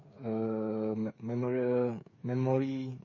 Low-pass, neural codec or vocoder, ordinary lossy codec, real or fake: 7.2 kHz; codec, 16 kHz, 8 kbps, FreqCodec, smaller model; MP3, 32 kbps; fake